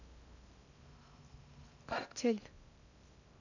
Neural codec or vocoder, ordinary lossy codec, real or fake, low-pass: codec, 16 kHz in and 24 kHz out, 0.6 kbps, FocalCodec, streaming, 2048 codes; none; fake; 7.2 kHz